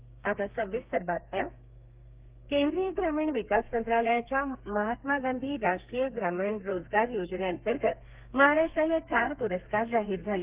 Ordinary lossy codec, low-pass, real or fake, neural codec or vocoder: Opus, 32 kbps; 3.6 kHz; fake; codec, 32 kHz, 1.9 kbps, SNAC